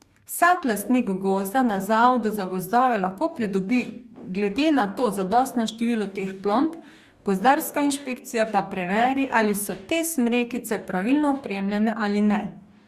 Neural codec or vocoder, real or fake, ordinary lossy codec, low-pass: codec, 44.1 kHz, 2.6 kbps, DAC; fake; Opus, 64 kbps; 14.4 kHz